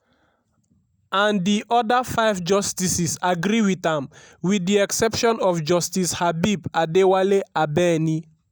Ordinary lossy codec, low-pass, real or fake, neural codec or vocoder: none; none; real; none